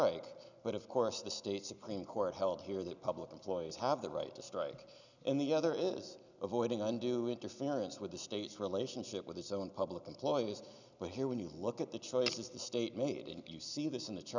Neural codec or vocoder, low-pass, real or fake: none; 7.2 kHz; real